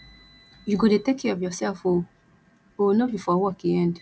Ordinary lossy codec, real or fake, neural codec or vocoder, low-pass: none; real; none; none